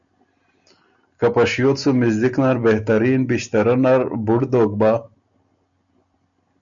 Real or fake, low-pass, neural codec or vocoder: real; 7.2 kHz; none